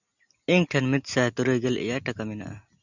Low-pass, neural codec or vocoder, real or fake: 7.2 kHz; none; real